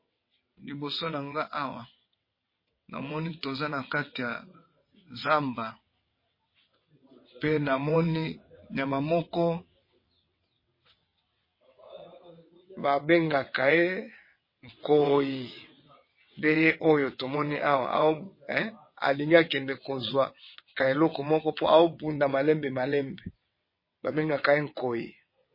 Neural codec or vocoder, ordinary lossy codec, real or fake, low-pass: vocoder, 22.05 kHz, 80 mel bands, WaveNeXt; MP3, 24 kbps; fake; 5.4 kHz